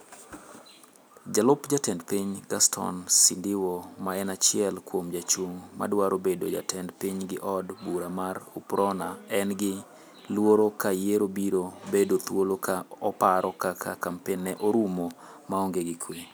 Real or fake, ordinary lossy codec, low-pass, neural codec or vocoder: real; none; none; none